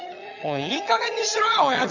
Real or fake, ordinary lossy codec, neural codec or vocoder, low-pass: fake; none; vocoder, 22.05 kHz, 80 mel bands, HiFi-GAN; 7.2 kHz